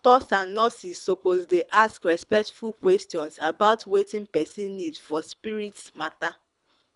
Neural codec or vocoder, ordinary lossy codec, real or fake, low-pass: codec, 24 kHz, 3 kbps, HILCodec; none; fake; 10.8 kHz